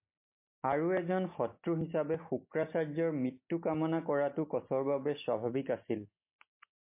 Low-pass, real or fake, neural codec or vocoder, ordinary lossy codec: 3.6 kHz; real; none; MP3, 24 kbps